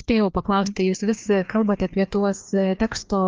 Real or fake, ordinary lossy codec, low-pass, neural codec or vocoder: fake; Opus, 32 kbps; 7.2 kHz; codec, 16 kHz, 2 kbps, FreqCodec, larger model